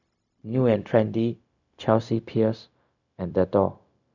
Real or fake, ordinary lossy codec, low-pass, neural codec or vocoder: fake; none; 7.2 kHz; codec, 16 kHz, 0.4 kbps, LongCat-Audio-Codec